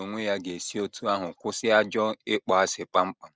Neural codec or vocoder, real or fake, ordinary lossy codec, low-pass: none; real; none; none